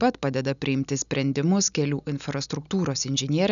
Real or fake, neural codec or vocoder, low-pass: real; none; 7.2 kHz